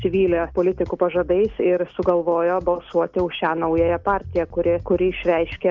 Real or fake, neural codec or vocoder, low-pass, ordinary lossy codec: real; none; 7.2 kHz; Opus, 24 kbps